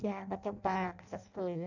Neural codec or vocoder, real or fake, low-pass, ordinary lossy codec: codec, 16 kHz in and 24 kHz out, 0.6 kbps, FireRedTTS-2 codec; fake; 7.2 kHz; none